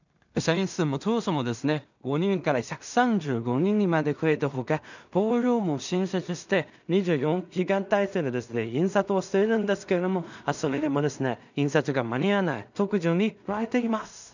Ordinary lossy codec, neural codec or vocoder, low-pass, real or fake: none; codec, 16 kHz in and 24 kHz out, 0.4 kbps, LongCat-Audio-Codec, two codebook decoder; 7.2 kHz; fake